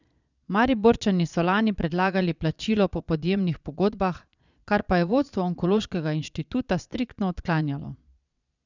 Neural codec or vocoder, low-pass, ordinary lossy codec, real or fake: vocoder, 22.05 kHz, 80 mel bands, Vocos; 7.2 kHz; none; fake